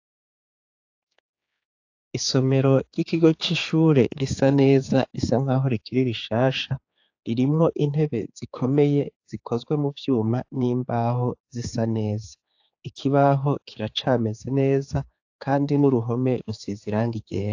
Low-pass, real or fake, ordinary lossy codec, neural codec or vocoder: 7.2 kHz; fake; AAC, 48 kbps; codec, 16 kHz, 4 kbps, X-Codec, HuBERT features, trained on general audio